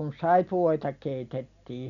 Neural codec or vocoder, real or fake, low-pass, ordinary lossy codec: none; real; 7.2 kHz; none